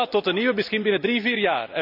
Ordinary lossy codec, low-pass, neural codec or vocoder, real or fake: none; 5.4 kHz; none; real